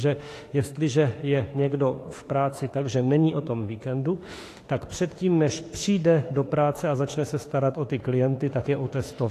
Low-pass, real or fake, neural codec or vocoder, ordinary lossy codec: 14.4 kHz; fake; autoencoder, 48 kHz, 32 numbers a frame, DAC-VAE, trained on Japanese speech; AAC, 48 kbps